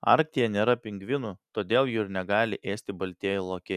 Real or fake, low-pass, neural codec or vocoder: real; 14.4 kHz; none